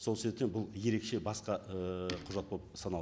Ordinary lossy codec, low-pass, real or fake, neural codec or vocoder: none; none; real; none